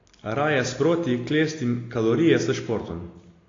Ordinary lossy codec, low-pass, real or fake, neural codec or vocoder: AAC, 32 kbps; 7.2 kHz; real; none